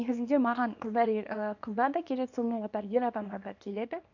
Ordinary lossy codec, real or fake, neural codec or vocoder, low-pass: none; fake; codec, 24 kHz, 0.9 kbps, WavTokenizer, small release; 7.2 kHz